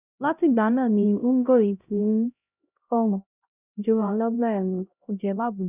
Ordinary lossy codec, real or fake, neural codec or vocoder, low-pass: none; fake; codec, 16 kHz, 0.5 kbps, X-Codec, HuBERT features, trained on LibriSpeech; 3.6 kHz